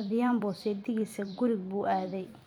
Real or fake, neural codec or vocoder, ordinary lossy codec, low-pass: fake; vocoder, 44.1 kHz, 128 mel bands every 256 samples, BigVGAN v2; none; 19.8 kHz